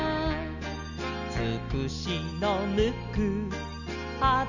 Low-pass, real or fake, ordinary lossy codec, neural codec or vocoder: 7.2 kHz; real; none; none